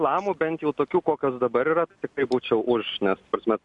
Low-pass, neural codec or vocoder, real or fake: 10.8 kHz; none; real